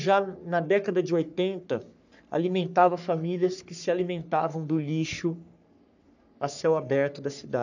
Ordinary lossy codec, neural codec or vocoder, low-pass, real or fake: none; codec, 44.1 kHz, 3.4 kbps, Pupu-Codec; 7.2 kHz; fake